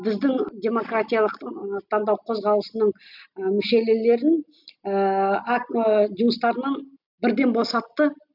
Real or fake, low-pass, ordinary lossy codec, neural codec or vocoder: real; 5.4 kHz; none; none